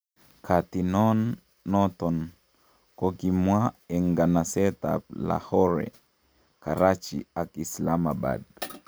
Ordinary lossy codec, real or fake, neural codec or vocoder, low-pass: none; real; none; none